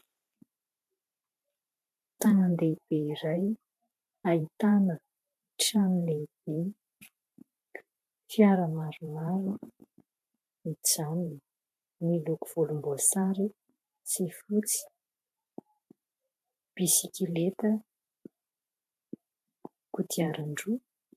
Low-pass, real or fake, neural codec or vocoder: 14.4 kHz; fake; vocoder, 44.1 kHz, 128 mel bands every 512 samples, BigVGAN v2